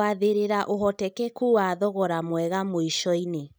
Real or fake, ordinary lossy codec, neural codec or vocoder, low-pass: real; none; none; none